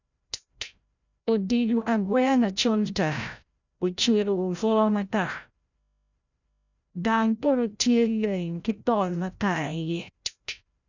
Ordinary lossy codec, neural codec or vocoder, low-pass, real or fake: none; codec, 16 kHz, 0.5 kbps, FreqCodec, larger model; 7.2 kHz; fake